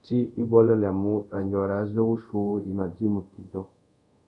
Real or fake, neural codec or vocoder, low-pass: fake; codec, 24 kHz, 0.5 kbps, DualCodec; 10.8 kHz